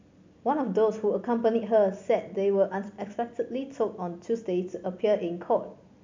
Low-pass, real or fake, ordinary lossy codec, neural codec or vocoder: 7.2 kHz; real; MP3, 64 kbps; none